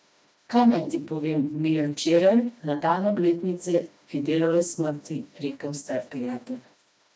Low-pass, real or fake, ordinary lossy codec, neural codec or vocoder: none; fake; none; codec, 16 kHz, 1 kbps, FreqCodec, smaller model